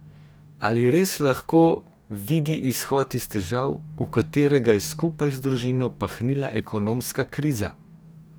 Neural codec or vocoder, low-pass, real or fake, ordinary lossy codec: codec, 44.1 kHz, 2.6 kbps, DAC; none; fake; none